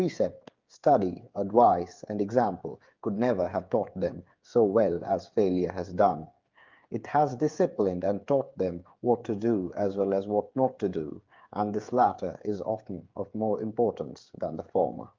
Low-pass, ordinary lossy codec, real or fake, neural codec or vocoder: 7.2 kHz; Opus, 24 kbps; fake; codec, 16 kHz, 8 kbps, FreqCodec, smaller model